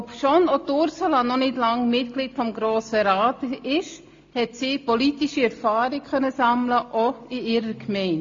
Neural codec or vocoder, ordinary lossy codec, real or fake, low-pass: none; AAC, 48 kbps; real; 7.2 kHz